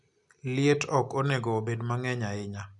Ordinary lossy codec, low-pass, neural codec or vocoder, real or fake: none; 10.8 kHz; none; real